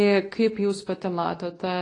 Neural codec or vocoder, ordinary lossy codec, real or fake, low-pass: codec, 24 kHz, 0.9 kbps, WavTokenizer, medium speech release version 2; AAC, 32 kbps; fake; 10.8 kHz